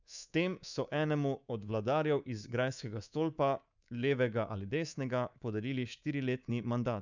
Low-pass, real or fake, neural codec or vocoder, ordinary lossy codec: 7.2 kHz; fake; codec, 24 kHz, 3.1 kbps, DualCodec; none